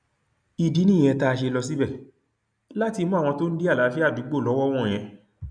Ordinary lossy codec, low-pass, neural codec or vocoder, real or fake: none; 9.9 kHz; none; real